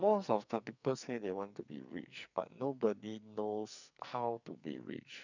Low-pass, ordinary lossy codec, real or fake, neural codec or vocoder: 7.2 kHz; none; fake; codec, 44.1 kHz, 2.6 kbps, SNAC